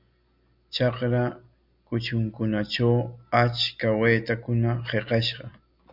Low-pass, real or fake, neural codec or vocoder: 5.4 kHz; real; none